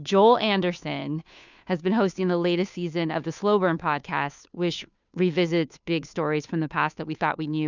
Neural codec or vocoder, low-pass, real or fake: codec, 16 kHz, 2 kbps, FunCodec, trained on Chinese and English, 25 frames a second; 7.2 kHz; fake